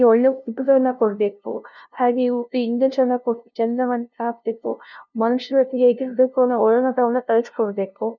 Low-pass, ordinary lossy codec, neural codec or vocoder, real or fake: 7.2 kHz; none; codec, 16 kHz, 0.5 kbps, FunCodec, trained on LibriTTS, 25 frames a second; fake